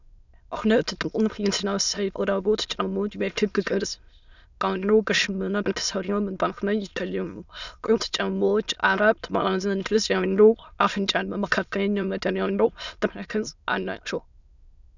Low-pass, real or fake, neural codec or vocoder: 7.2 kHz; fake; autoencoder, 22.05 kHz, a latent of 192 numbers a frame, VITS, trained on many speakers